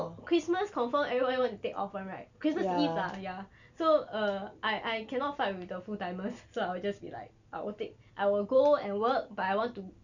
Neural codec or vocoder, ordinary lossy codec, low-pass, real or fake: none; none; 7.2 kHz; real